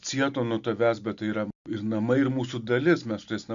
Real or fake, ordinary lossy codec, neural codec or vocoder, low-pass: real; Opus, 64 kbps; none; 7.2 kHz